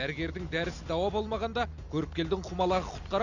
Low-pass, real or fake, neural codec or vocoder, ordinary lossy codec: 7.2 kHz; real; none; none